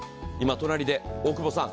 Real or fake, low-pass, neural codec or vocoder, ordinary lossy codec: real; none; none; none